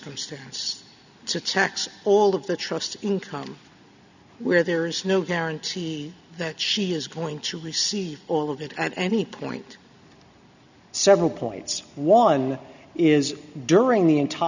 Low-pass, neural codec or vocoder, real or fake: 7.2 kHz; none; real